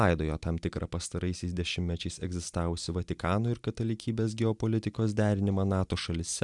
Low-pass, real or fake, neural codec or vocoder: 10.8 kHz; real; none